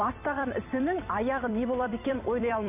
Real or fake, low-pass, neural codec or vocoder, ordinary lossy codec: fake; 3.6 kHz; vocoder, 44.1 kHz, 128 mel bands every 256 samples, BigVGAN v2; none